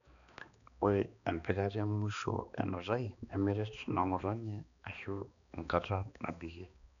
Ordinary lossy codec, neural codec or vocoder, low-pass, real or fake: none; codec, 16 kHz, 2 kbps, X-Codec, HuBERT features, trained on balanced general audio; 7.2 kHz; fake